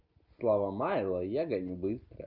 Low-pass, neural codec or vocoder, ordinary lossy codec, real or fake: 5.4 kHz; none; Opus, 32 kbps; real